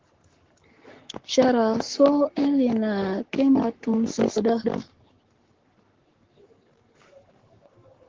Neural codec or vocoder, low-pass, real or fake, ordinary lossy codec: codec, 44.1 kHz, 7.8 kbps, Pupu-Codec; 7.2 kHz; fake; Opus, 16 kbps